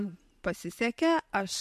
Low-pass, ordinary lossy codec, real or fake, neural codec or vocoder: 14.4 kHz; MP3, 64 kbps; real; none